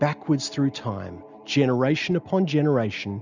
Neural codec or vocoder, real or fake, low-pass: none; real; 7.2 kHz